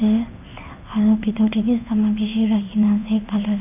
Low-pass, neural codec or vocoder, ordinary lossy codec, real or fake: 3.6 kHz; codec, 16 kHz in and 24 kHz out, 1 kbps, XY-Tokenizer; none; fake